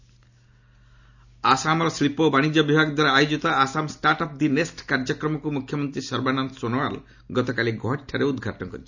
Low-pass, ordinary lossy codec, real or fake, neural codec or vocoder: 7.2 kHz; none; real; none